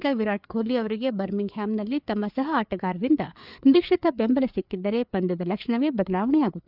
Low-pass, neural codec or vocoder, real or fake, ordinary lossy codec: 5.4 kHz; codec, 16 kHz, 4 kbps, FreqCodec, larger model; fake; none